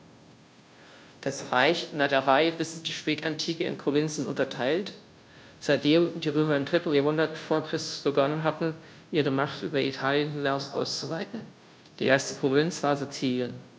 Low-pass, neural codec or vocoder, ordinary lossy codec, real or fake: none; codec, 16 kHz, 0.5 kbps, FunCodec, trained on Chinese and English, 25 frames a second; none; fake